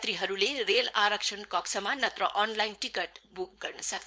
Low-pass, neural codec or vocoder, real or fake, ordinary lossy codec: none; codec, 16 kHz, 4.8 kbps, FACodec; fake; none